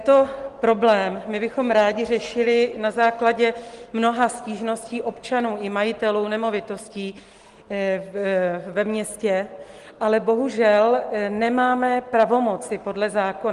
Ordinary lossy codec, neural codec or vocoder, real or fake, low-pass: Opus, 24 kbps; none; real; 10.8 kHz